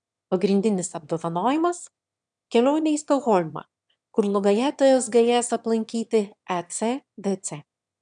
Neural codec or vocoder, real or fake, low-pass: autoencoder, 22.05 kHz, a latent of 192 numbers a frame, VITS, trained on one speaker; fake; 9.9 kHz